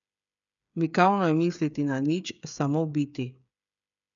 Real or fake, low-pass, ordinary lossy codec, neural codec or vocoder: fake; 7.2 kHz; none; codec, 16 kHz, 8 kbps, FreqCodec, smaller model